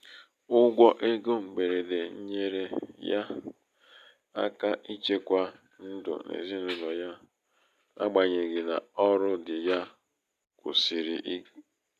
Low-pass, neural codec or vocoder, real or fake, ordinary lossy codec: 14.4 kHz; none; real; none